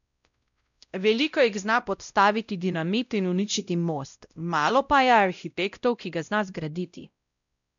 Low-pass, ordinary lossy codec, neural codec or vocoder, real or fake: 7.2 kHz; none; codec, 16 kHz, 0.5 kbps, X-Codec, WavLM features, trained on Multilingual LibriSpeech; fake